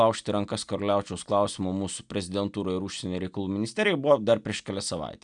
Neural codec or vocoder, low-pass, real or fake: none; 9.9 kHz; real